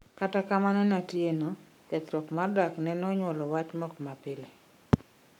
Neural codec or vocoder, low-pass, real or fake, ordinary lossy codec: codec, 44.1 kHz, 7.8 kbps, Pupu-Codec; 19.8 kHz; fake; none